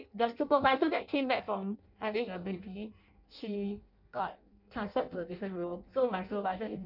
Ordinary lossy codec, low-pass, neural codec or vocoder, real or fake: none; 5.4 kHz; codec, 16 kHz in and 24 kHz out, 0.6 kbps, FireRedTTS-2 codec; fake